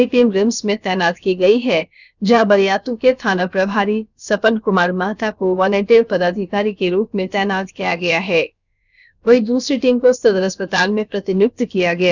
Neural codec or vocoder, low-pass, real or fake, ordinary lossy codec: codec, 16 kHz, about 1 kbps, DyCAST, with the encoder's durations; 7.2 kHz; fake; none